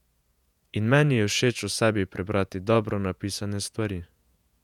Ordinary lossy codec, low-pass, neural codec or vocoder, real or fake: none; 19.8 kHz; vocoder, 48 kHz, 128 mel bands, Vocos; fake